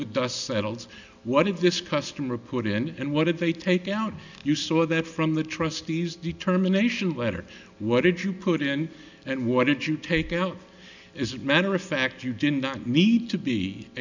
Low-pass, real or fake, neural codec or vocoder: 7.2 kHz; real; none